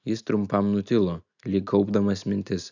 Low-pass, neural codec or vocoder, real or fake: 7.2 kHz; none; real